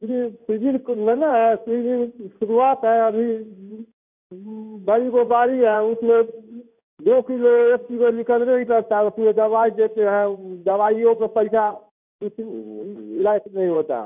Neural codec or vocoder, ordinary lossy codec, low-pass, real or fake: codec, 16 kHz in and 24 kHz out, 1 kbps, XY-Tokenizer; none; 3.6 kHz; fake